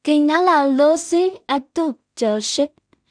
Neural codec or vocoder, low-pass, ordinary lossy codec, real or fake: codec, 16 kHz in and 24 kHz out, 0.4 kbps, LongCat-Audio-Codec, two codebook decoder; 9.9 kHz; none; fake